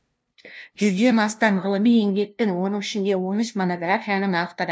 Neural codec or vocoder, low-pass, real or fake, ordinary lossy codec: codec, 16 kHz, 0.5 kbps, FunCodec, trained on LibriTTS, 25 frames a second; none; fake; none